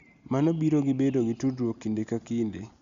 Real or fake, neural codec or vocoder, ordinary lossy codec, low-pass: real; none; Opus, 64 kbps; 7.2 kHz